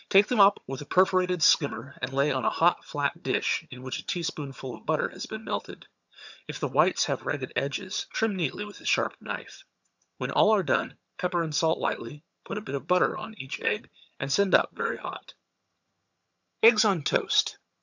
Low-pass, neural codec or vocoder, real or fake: 7.2 kHz; vocoder, 22.05 kHz, 80 mel bands, HiFi-GAN; fake